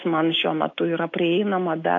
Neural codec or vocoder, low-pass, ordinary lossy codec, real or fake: none; 7.2 kHz; AAC, 48 kbps; real